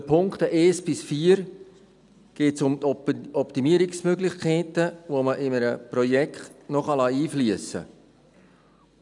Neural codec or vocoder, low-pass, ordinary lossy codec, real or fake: none; 10.8 kHz; none; real